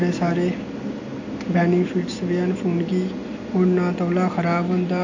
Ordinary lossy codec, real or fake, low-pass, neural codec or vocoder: AAC, 48 kbps; real; 7.2 kHz; none